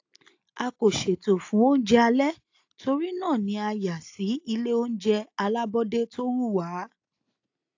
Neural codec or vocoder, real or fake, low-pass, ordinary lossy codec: none; real; 7.2 kHz; AAC, 48 kbps